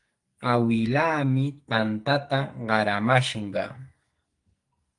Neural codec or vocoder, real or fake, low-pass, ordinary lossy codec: codec, 44.1 kHz, 2.6 kbps, SNAC; fake; 10.8 kHz; Opus, 32 kbps